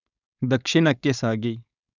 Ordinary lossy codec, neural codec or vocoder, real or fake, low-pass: none; codec, 16 kHz, 4.8 kbps, FACodec; fake; 7.2 kHz